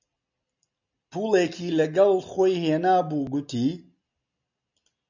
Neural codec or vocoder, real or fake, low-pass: none; real; 7.2 kHz